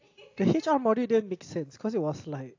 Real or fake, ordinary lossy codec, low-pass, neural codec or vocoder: real; AAC, 48 kbps; 7.2 kHz; none